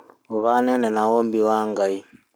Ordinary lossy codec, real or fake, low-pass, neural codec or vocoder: none; fake; none; codec, 44.1 kHz, 7.8 kbps, Pupu-Codec